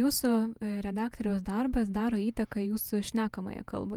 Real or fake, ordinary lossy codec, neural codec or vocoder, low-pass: fake; Opus, 32 kbps; vocoder, 48 kHz, 128 mel bands, Vocos; 19.8 kHz